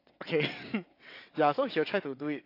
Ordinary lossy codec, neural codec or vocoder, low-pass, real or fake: AAC, 32 kbps; vocoder, 22.05 kHz, 80 mel bands, WaveNeXt; 5.4 kHz; fake